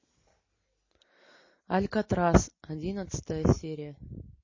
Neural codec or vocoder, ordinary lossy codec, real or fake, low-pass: none; MP3, 32 kbps; real; 7.2 kHz